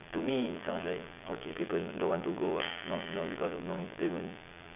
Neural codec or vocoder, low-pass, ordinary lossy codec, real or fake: vocoder, 22.05 kHz, 80 mel bands, Vocos; 3.6 kHz; none; fake